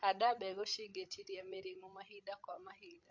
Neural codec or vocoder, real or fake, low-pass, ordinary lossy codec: codec, 16 kHz, 16 kbps, FreqCodec, larger model; fake; 7.2 kHz; MP3, 48 kbps